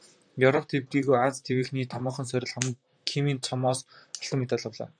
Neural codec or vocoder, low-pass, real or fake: vocoder, 44.1 kHz, 128 mel bands, Pupu-Vocoder; 9.9 kHz; fake